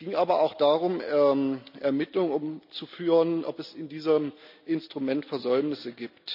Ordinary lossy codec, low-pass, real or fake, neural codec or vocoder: none; 5.4 kHz; real; none